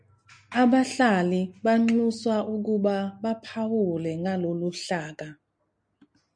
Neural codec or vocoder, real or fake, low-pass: none; real; 9.9 kHz